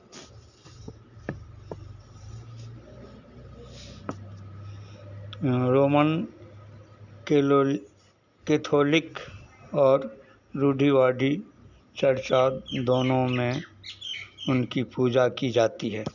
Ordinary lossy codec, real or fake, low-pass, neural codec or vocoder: none; real; 7.2 kHz; none